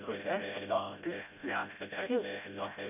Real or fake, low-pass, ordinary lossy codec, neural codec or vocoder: fake; 3.6 kHz; none; codec, 16 kHz, 0.5 kbps, FreqCodec, smaller model